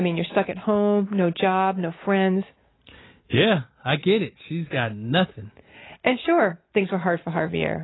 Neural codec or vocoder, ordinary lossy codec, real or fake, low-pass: none; AAC, 16 kbps; real; 7.2 kHz